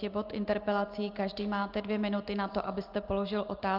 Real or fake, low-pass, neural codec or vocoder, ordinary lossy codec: real; 5.4 kHz; none; Opus, 32 kbps